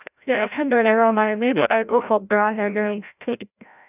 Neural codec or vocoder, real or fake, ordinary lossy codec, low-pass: codec, 16 kHz, 0.5 kbps, FreqCodec, larger model; fake; none; 3.6 kHz